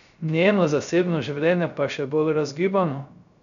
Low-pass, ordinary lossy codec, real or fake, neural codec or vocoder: 7.2 kHz; none; fake; codec, 16 kHz, 0.3 kbps, FocalCodec